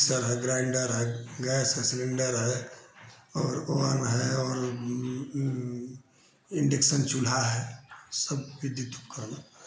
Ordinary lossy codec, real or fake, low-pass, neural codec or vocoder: none; real; none; none